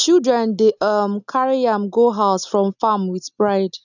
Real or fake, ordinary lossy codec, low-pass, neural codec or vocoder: real; none; 7.2 kHz; none